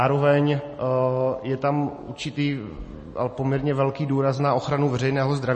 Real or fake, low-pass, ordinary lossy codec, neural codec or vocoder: real; 10.8 kHz; MP3, 32 kbps; none